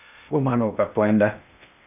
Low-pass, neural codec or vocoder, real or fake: 3.6 kHz; codec, 16 kHz in and 24 kHz out, 0.6 kbps, FocalCodec, streaming, 2048 codes; fake